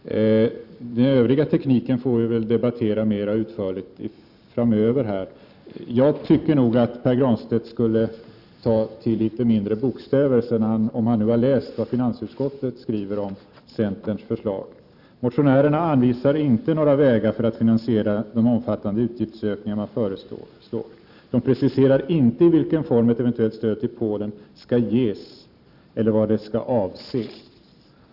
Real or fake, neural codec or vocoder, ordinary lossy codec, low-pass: fake; vocoder, 44.1 kHz, 128 mel bands every 512 samples, BigVGAN v2; none; 5.4 kHz